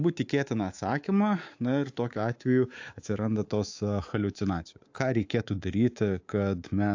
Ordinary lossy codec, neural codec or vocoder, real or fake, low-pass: AAC, 48 kbps; codec, 24 kHz, 3.1 kbps, DualCodec; fake; 7.2 kHz